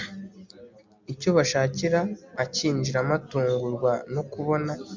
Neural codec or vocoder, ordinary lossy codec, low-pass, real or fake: none; MP3, 64 kbps; 7.2 kHz; real